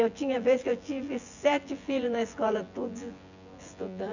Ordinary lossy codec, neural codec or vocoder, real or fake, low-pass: none; vocoder, 24 kHz, 100 mel bands, Vocos; fake; 7.2 kHz